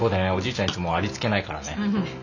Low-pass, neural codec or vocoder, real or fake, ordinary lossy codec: 7.2 kHz; none; real; none